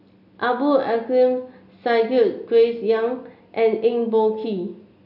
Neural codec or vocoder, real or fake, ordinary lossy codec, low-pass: none; real; none; 5.4 kHz